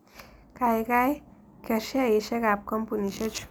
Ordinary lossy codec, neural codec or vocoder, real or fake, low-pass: none; none; real; none